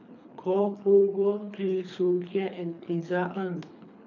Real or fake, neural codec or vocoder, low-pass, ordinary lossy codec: fake; codec, 24 kHz, 3 kbps, HILCodec; 7.2 kHz; none